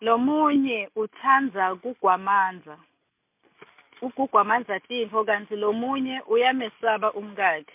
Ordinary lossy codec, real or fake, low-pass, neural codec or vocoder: MP3, 32 kbps; real; 3.6 kHz; none